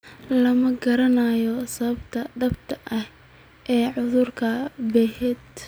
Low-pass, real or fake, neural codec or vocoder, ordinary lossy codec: none; real; none; none